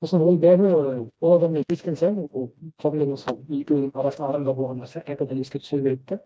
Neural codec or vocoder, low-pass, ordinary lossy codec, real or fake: codec, 16 kHz, 1 kbps, FreqCodec, smaller model; none; none; fake